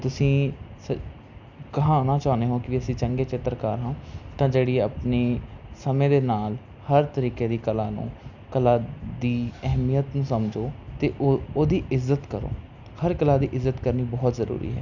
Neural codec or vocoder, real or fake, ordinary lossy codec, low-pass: none; real; none; 7.2 kHz